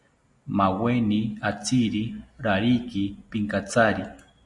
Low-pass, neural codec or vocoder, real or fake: 10.8 kHz; none; real